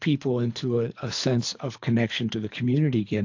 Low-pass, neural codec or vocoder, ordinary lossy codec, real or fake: 7.2 kHz; codec, 24 kHz, 3 kbps, HILCodec; AAC, 48 kbps; fake